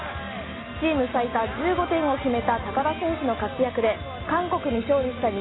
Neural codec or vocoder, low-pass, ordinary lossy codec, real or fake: none; 7.2 kHz; AAC, 16 kbps; real